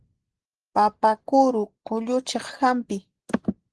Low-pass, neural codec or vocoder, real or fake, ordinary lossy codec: 10.8 kHz; none; real; Opus, 16 kbps